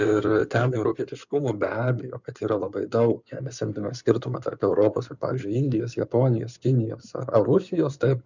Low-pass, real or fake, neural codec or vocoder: 7.2 kHz; fake; codec, 16 kHz in and 24 kHz out, 2.2 kbps, FireRedTTS-2 codec